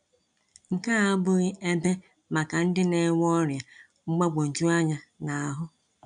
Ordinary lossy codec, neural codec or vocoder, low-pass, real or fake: none; none; 9.9 kHz; real